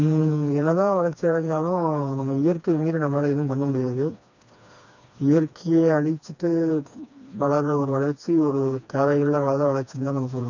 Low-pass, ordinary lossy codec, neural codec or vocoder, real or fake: 7.2 kHz; none; codec, 16 kHz, 2 kbps, FreqCodec, smaller model; fake